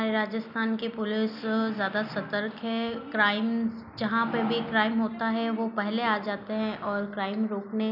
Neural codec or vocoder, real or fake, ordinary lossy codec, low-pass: none; real; none; 5.4 kHz